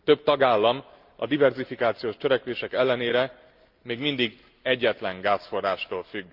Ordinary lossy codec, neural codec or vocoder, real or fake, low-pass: Opus, 24 kbps; none; real; 5.4 kHz